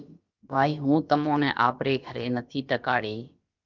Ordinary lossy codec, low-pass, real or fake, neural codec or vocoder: Opus, 24 kbps; 7.2 kHz; fake; codec, 16 kHz, about 1 kbps, DyCAST, with the encoder's durations